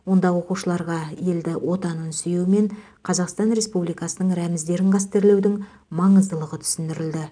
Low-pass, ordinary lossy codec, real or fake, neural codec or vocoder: 9.9 kHz; none; real; none